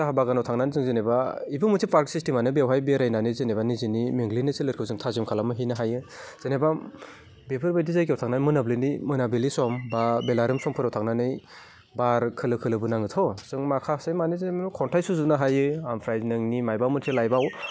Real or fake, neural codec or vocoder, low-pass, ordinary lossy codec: real; none; none; none